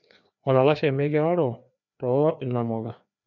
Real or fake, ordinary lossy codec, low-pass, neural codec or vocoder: fake; none; 7.2 kHz; codec, 16 kHz, 2 kbps, FreqCodec, larger model